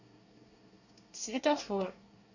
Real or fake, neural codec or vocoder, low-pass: fake; codec, 24 kHz, 1 kbps, SNAC; 7.2 kHz